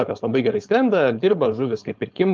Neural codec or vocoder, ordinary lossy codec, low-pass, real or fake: codec, 16 kHz, 4.8 kbps, FACodec; Opus, 24 kbps; 7.2 kHz; fake